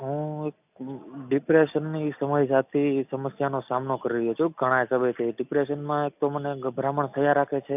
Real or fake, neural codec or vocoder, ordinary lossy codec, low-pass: real; none; none; 3.6 kHz